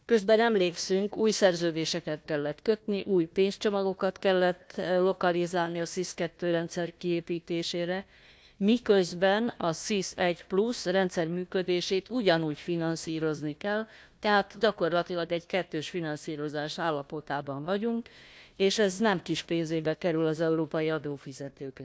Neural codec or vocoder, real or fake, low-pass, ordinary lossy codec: codec, 16 kHz, 1 kbps, FunCodec, trained on Chinese and English, 50 frames a second; fake; none; none